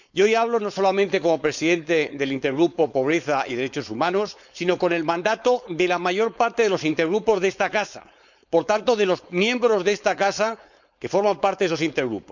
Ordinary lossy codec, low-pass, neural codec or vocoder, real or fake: none; 7.2 kHz; codec, 16 kHz, 4.8 kbps, FACodec; fake